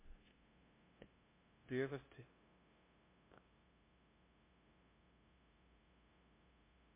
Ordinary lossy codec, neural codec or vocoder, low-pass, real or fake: MP3, 24 kbps; codec, 16 kHz, 0.5 kbps, FunCodec, trained on LibriTTS, 25 frames a second; 3.6 kHz; fake